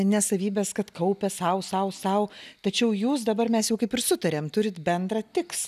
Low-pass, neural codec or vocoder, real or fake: 14.4 kHz; none; real